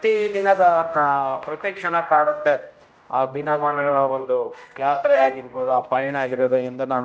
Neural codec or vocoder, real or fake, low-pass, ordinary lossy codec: codec, 16 kHz, 0.5 kbps, X-Codec, HuBERT features, trained on general audio; fake; none; none